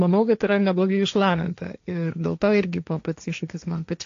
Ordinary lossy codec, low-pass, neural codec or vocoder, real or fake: AAC, 48 kbps; 7.2 kHz; codec, 16 kHz, 1.1 kbps, Voila-Tokenizer; fake